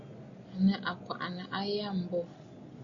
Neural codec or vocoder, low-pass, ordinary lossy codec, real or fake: none; 7.2 kHz; Opus, 64 kbps; real